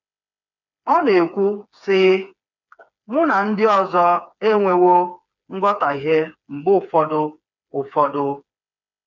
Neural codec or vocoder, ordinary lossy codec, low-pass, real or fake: codec, 16 kHz, 4 kbps, FreqCodec, smaller model; none; 7.2 kHz; fake